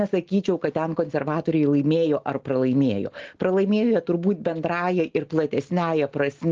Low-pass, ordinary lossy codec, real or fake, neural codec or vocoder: 7.2 kHz; Opus, 16 kbps; real; none